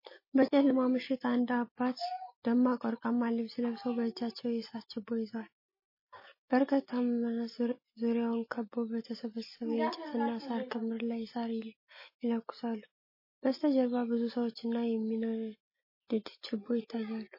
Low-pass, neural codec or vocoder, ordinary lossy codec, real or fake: 5.4 kHz; none; MP3, 24 kbps; real